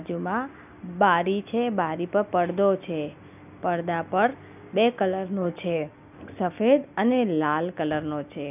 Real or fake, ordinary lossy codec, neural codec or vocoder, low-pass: real; none; none; 3.6 kHz